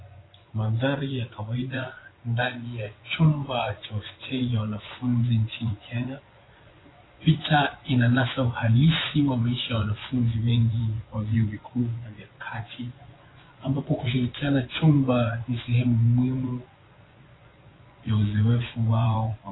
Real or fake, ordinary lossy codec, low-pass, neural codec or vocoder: fake; AAC, 16 kbps; 7.2 kHz; vocoder, 24 kHz, 100 mel bands, Vocos